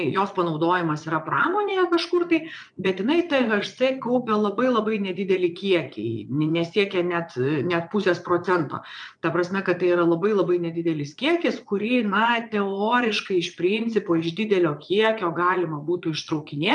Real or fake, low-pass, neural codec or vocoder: fake; 9.9 kHz; vocoder, 22.05 kHz, 80 mel bands, Vocos